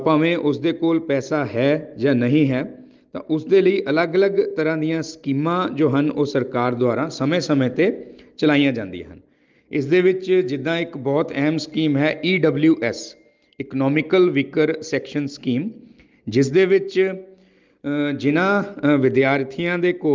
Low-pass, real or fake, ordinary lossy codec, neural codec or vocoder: 7.2 kHz; real; Opus, 32 kbps; none